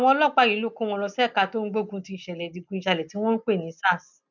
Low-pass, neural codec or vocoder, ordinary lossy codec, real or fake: 7.2 kHz; none; none; real